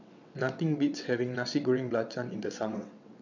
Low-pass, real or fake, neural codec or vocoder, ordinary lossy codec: 7.2 kHz; fake; vocoder, 22.05 kHz, 80 mel bands, WaveNeXt; none